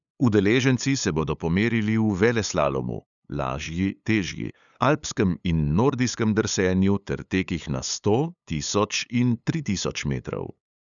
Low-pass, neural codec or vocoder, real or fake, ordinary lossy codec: 7.2 kHz; codec, 16 kHz, 8 kbps, FunCodec, trained on LibriTTS, 25 frames a second; fake; none